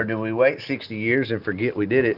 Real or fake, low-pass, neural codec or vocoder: real; 5.4 kHz; none